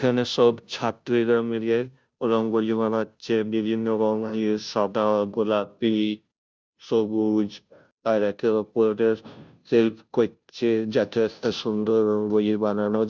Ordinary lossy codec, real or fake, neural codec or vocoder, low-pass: none; fake; codec, 16 kHz, 0.5 kbps, FunCodec, trained on Chinese and English, 25 frames a second; none